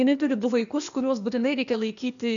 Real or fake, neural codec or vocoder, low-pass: fake; codec, 16 kHz, 0.8 kbps, ZipCodec; 7.2 kHz